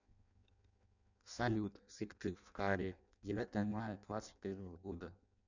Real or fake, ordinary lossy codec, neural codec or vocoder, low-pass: fake; none; codec, 16 kHz in and 24 kHz out, 0.6 kbps, FireRedTTS-2 codec; 7.2 kHz